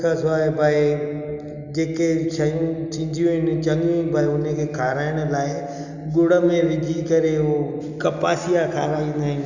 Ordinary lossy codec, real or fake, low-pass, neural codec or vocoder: none; real; 7.2 kHz; none